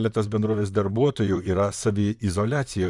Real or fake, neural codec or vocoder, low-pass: fake; vocoder, 44.1 kHz, 128 mel bands, Pupu-Vocoder; 10.8 kHz